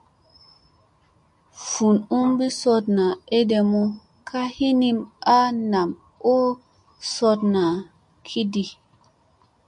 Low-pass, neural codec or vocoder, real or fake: 10.8 kHz; none; real